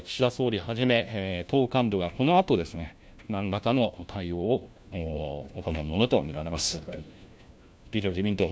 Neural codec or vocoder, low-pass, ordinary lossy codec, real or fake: codec, 16 kHz, 1 kbps, FunCodec, trained on LibriTTS, 50 frames a second; none; none; fake